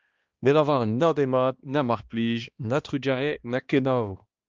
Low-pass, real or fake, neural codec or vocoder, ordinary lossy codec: 7.2 kHz; fake; codec, 16 kHz, 1 kbps, X-Codec, HuBERT features, trained on balanced general audio; Opus, 24 kbps